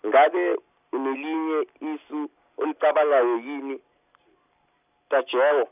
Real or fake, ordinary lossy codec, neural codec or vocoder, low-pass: real; none; none; 3.6 kHz